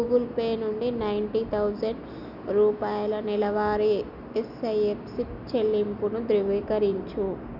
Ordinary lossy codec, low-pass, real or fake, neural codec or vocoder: none; 5.4 kHz; real; none